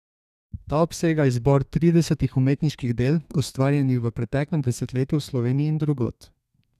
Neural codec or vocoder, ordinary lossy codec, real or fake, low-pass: codec, 32 kHz, 1.9 kbps, SNAC; none; fake; 14.4 kHz